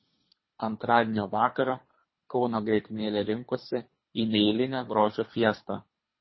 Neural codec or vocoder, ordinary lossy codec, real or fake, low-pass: codec, 24 kHz, 3 kbps, HILCodec; MP3, 24 kbps; fake; 7.2 kHz